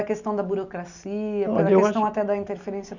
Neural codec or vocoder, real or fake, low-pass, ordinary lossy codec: none; real; 7.2 kHz; none